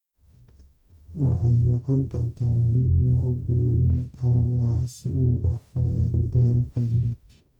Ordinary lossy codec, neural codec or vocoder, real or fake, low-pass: none; codec, 44.1 kHz, 0.9 kbps, DAC; fake; 19.8 kHz